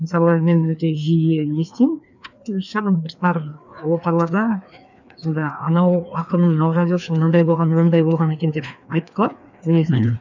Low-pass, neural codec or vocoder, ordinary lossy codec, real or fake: 7.2 kHz; codec, 16 kHz, 2 kbps, FreqCodec, larger model; none; fake